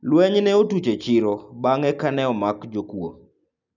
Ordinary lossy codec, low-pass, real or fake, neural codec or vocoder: none; 7.2 kHz; real; none